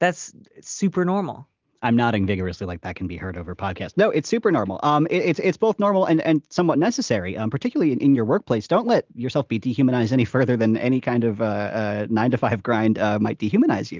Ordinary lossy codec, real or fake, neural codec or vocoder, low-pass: Opus, 32 kbps; real; none; 7.2 kHz